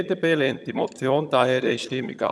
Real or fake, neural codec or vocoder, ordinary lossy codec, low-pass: fake; vocoder, 22.05 kHz, 80 mel bands, HiFi-GAN; none; none